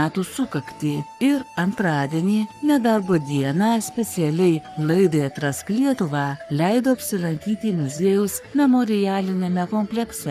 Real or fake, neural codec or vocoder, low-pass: fake; codec, 44.1 kHz, 3.4 kbps, Pupu-Codec; 14.4 kHz